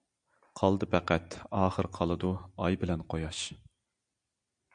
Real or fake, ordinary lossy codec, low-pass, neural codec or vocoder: real; MP3, 64 kbps; 9.9 kHz; none